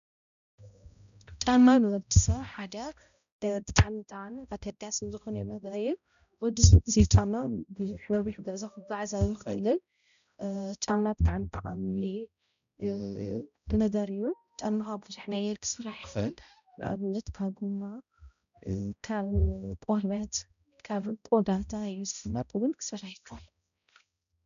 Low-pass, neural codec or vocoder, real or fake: 7.2 kHz; codec, 16 kHz, 0.5 kbps, X-Codec, HuBERT features, trained on balanced general audio; fake